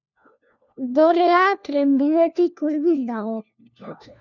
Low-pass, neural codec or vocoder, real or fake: 7.2 kHz; codec, 16 kHz, 1 kbps, FunCodec, trained on LibriTTS, 50 frames a second; fake